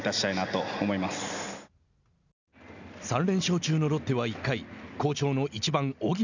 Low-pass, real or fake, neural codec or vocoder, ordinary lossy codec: 7.2 kHz; real; none; none